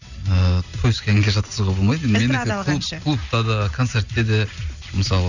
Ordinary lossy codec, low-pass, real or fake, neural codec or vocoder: none; 7.2 kHz; real; none